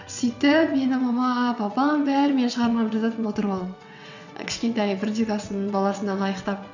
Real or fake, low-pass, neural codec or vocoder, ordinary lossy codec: fake; 7.2 kHz; vocoder, 22.05 kHz, 80 mel bands, WaveNeXt; none